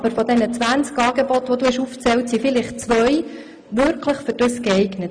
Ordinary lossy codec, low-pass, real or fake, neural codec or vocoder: MP3, 64 kbps; 9.9 kHz; real; none